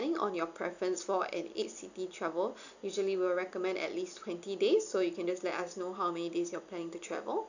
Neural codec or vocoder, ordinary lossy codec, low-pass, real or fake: none; none; 7.2 kHz; real